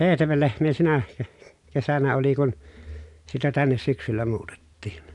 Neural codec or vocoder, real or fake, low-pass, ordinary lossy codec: none; real; 10.8 kHz; none